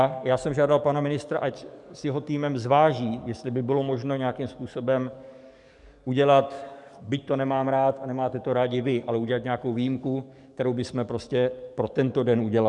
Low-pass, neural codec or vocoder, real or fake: 10.8 kHz; codec, 44.1 kHz, 7.8 kbps, DAC; fake